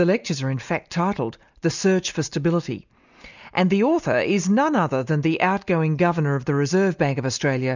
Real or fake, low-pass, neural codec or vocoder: real; 7.2 kHz; none